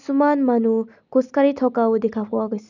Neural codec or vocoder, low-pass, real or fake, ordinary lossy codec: none; 7.2 kHz; real; none